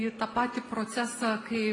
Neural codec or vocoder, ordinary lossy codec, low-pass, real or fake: none; AAC, 32 kbps; 10.8 kHz; real